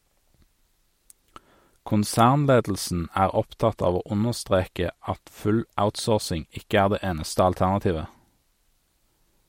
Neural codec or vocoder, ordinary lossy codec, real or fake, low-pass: none; MP3, 64 kbps; real; 19.8 kHz